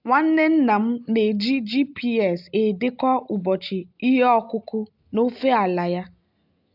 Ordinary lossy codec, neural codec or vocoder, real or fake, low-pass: AAC, 48 kbps; none; real; 5.4 kHz